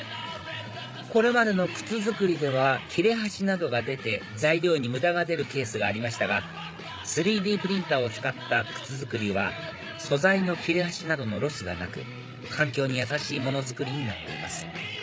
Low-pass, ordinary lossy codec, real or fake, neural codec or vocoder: none; none; fake; codec, 16 kHz, 8 kbps, FreqCodec, larger model